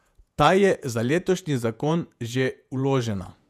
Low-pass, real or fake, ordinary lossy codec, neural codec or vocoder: 14.4 kHz; fake; none; vocoder, 48 kHz, 128 mel bands, Vocos